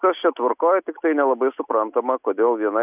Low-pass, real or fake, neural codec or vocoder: 3.6 kHz; real; none